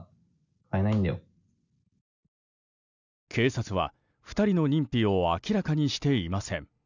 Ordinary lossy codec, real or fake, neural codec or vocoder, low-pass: none; real; none; 7.2 kHz